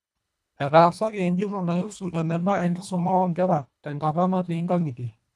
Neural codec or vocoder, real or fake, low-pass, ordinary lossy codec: codec, 24 kHz, 1.5 kbps, HILCodec; fake; none; none